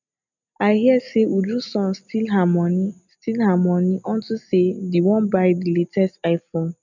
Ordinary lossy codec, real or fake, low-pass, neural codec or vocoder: none; real; 7.2 kHz; none